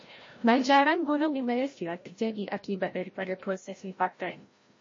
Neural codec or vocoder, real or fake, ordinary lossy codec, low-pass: codec, 16 kHz, 0.5 kbps, FreqCodec, larger model; fake; MP3, 32 kbps; 7.2 kHz